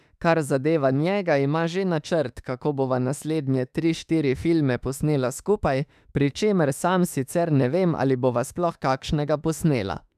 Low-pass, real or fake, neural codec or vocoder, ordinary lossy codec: 14.4 kHz; fake; autoencoder, 48 kHz, 32 numbers a frame, DAC-VAE, trained on Japanese speech; none